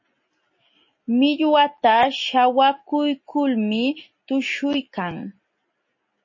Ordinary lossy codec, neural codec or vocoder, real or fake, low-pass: MP3, 32 kbps; none; real; 7.2 kHz